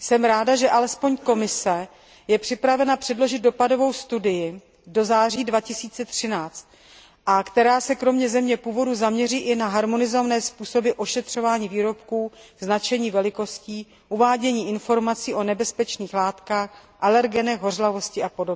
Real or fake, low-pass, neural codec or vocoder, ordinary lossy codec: real; none; none; none